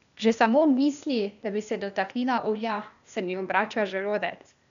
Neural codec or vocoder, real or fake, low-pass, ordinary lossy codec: codec, 16 kHz, 0.8 kbps, ZipCodec; fake; 7.2 kHz; none